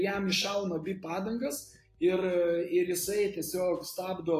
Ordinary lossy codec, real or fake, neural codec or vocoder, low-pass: AAC, 48 kbps; real; none; 14.4 kHz